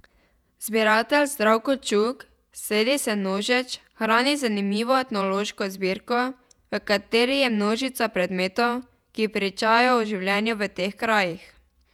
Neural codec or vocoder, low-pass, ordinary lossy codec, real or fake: vocoder, 48 kHz, 128 mel bands, Vocos; 19.8 kHz; none; fake